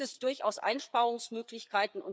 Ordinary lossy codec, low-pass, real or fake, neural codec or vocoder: none; none; fake; codec, 16 kHz, 4 kbps, FreqCodec, larger model